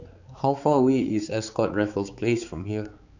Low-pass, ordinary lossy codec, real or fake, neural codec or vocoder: 7.2 kHz; none; fake; codec, 16 kHz, 4 kbps, X-Codec, HuBERT features, trained on balanced general audio